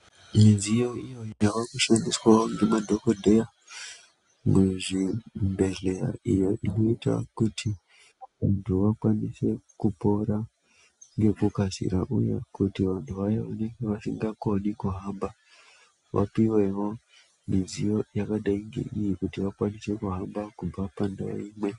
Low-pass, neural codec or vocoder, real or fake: 10.8 kHz; none; real